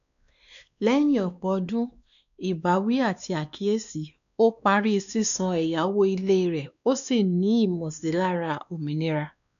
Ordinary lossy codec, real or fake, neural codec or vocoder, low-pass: none; fake; codec, 16 kHz, 2 kbps, X-Codec, WavLM features, trained on Multilingual LibriSpeech; 7.2 kHz